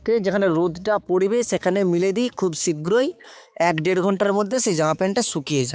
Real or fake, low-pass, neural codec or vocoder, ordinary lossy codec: fake; none; codec, 16 kHz, 4 kbps, X-Codec, HuBERT features, trained on balanced general audio; none